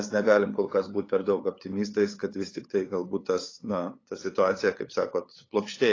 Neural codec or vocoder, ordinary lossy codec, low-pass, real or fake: codec, 16 kHz, 8 kbps, FunCodec, trained on LibriTTS, 25 frames a second; AAC, 32 kbps; 7.2 kHz; fake